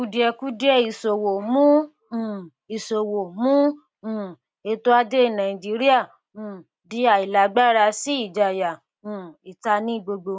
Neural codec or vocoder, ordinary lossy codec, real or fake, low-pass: none; none; real; none